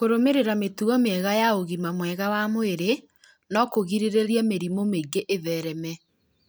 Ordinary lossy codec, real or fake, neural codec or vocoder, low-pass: none; real; none; none